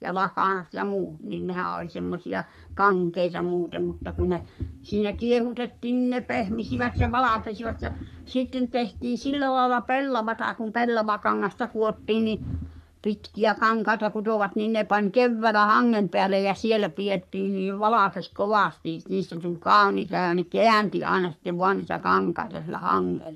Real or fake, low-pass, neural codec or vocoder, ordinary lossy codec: fake; 14.4 kHz; codec, 44.1 kHz, 3.4 kbps, Pupu-Codec; none